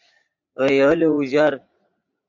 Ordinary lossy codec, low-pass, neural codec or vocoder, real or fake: MP3, 64 kbps; 7.2 kHz; vocoder, 44.1 kHz, 80 mel bands, Vocos; fake